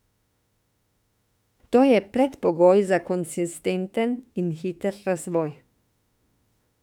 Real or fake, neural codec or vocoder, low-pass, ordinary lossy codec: fake; autoencoder, 48 kHz, 32 numbers a frame, DAC-VAE, trained on Japanese speech; 19.8 kHz; none